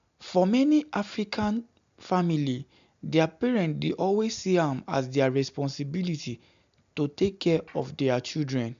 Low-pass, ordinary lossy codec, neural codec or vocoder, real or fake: 7.2 kHz; MP3, 64 kbps; none; real